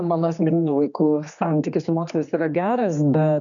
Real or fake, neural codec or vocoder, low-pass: fake; codec, 16 kHz, 2 kbps, X-Codec, HuBERT features, trained on general audio; 7.2 kHz